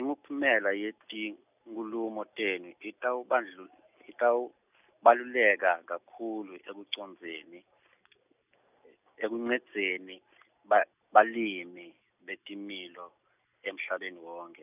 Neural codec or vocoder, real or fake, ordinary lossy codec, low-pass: none; real; none; 3.6 kHz